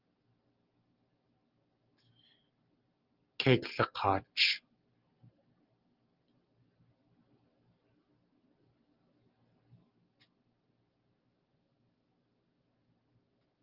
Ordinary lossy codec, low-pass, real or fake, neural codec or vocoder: Opus, 24 kbps; 5.4 kHz; real; none